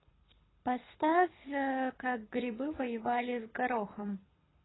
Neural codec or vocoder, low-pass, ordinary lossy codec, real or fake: codec, 24 kHz, 6 kbps, HILCodec; 7.2 kHz; AAC, 16 kbps; fake